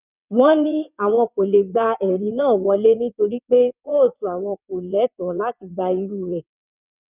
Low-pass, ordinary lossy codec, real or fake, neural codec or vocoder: 3.6 kHz; none; fake; vocoder, 22.05 kHz, 80 mel bands, WaveNeXt